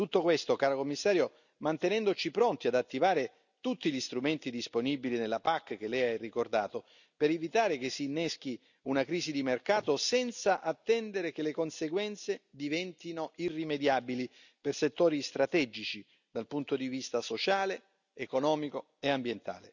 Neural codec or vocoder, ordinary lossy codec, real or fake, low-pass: none; none; real; 7.2 kHz